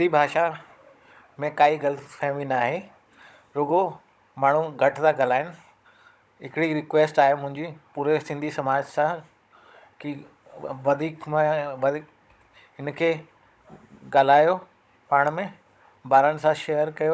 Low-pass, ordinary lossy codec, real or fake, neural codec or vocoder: none; none; fake; codec, 16 kHz, 16 kbps, FunCodec, trained on Chinese and English, 50 frames a second